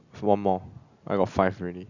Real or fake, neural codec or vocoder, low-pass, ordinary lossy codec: real; none; 7.2 kHz; none